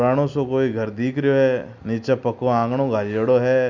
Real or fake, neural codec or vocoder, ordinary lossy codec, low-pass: real; none; none; 7.2 kHz